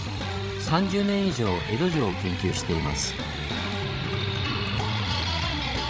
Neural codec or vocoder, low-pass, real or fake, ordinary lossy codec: codec, 16 kHz, 16 kbps, FreqCodec, larger model; none; fake; none